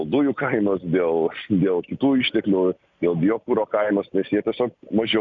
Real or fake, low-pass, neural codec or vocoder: real; 7.2 kHz; none